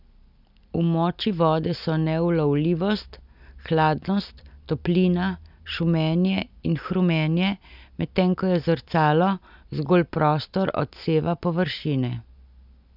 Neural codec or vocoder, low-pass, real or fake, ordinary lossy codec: none; 5.4 kHz; real; none